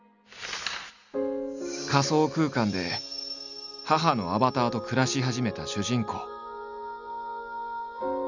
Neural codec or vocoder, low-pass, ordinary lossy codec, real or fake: none; 7.2 kHz; none; real